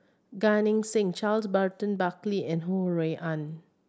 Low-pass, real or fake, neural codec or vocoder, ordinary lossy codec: none; real; none; none